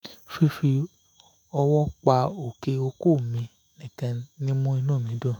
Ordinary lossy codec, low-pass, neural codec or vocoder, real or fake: none; none; autoencoder, 48 kHz, 128 numbers a frame, DAC-VAE, trained on Japanese speech; fake